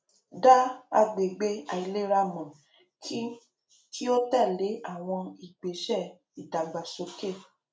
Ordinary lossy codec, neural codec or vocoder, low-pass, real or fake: none; none; none; real